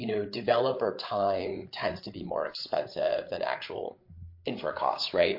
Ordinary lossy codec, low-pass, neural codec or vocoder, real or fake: MP3, 32 kbps; 5.4 kHz; codec, 16 kHz, 8 kbps, FreqCodec, larger model; fake